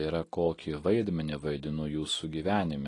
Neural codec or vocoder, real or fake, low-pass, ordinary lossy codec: none; real; 10.8 kHz; AAC, 32 kbps